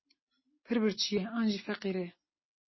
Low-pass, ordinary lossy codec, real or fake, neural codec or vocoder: 7.2 kHz; MP3, 24 kbps; real; none